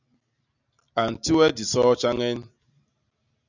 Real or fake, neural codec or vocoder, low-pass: real; none; 7.2 kHz